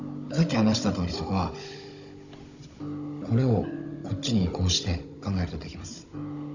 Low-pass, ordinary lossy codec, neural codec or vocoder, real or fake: 7.2 kHz; none; codec, 16 kHz, 16 kbps, FunCodec, trained on Chinese and English, 50 frames a second; fake